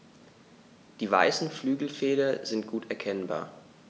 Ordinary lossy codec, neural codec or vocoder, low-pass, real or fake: none; none; none; real